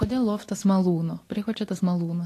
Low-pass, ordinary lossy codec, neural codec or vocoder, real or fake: 14.4 kHz; AAC, 48 kbps; none; real